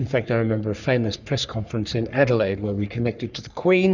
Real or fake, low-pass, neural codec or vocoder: fake; 7.2 kHz; codec, 44.1 kHz, 3.4 kbps, Pupu-Codec